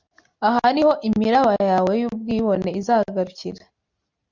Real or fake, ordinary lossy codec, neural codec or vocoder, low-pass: real; Opus, 64 kbps; none; 7.2 kHz